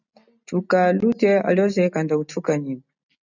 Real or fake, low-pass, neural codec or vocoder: real; 7.2 kHz; none